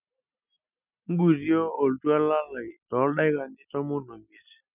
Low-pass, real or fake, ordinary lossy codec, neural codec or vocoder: 3.6 kHz; real; none; none